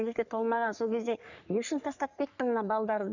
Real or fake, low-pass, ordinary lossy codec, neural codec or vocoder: fake; 7.2 kHz; none; codec, 44.1 kHz, 3.4 kbps, Pupu-Codec